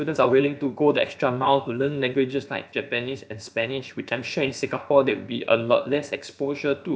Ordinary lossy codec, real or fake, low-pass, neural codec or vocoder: none; fake; none; codec, 16 kHz, about 1 kbps, DyCAST, with the encoder's durations